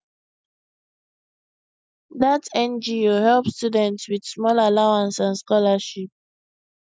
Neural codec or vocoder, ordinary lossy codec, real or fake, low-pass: none; none; real; none